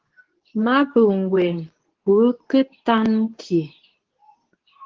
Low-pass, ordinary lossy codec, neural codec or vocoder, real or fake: 7.2 kHz; Opus, 16 kbps; codec, 24 kHz, 0.9 kbps, WavTokenizer, medium speech release version 2; fake